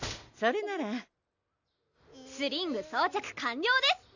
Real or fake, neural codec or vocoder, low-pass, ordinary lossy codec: real; none; 7.2 kHz; none